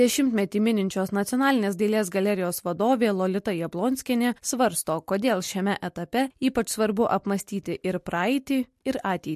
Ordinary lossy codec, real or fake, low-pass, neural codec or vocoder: MP3, 64 kbps; real; 14.4 kHz; none